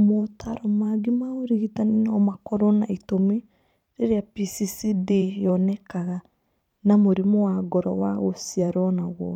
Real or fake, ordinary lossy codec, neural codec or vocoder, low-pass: fake; none; vocoder, 44.1 kHz, 128 mel bands every 512 samples, BigVGAN v2; 19.8 kHz